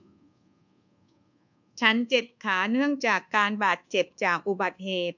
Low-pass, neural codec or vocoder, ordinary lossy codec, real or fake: 7.2 kHz; codec, 24 kHz, 1.2 kbps, DualCodec; none; fake